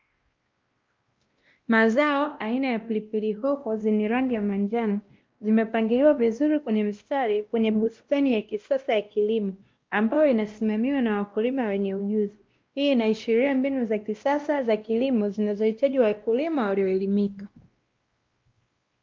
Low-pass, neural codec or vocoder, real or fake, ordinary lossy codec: 7.2 kHz; codec, 16 kHz, 1 kbps, X-Codec, WavLM features, trained on Multilingual LibriSpeech; fake; Opus, 24 kbps